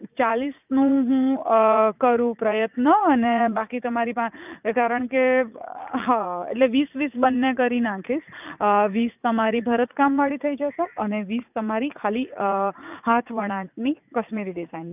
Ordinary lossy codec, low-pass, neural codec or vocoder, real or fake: none; 3.6 kHz; vocoder, 44.1 kHz, 80 mel bands, Vocos; fake